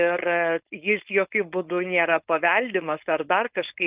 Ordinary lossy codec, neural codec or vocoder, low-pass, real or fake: Opus, 32 kbps; codec, 16 kHz, 4.8 kbps, FACodec; 3.6 kHz; fake